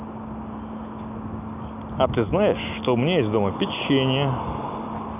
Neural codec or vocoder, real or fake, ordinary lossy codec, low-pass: none; real; none; 3.6 kHz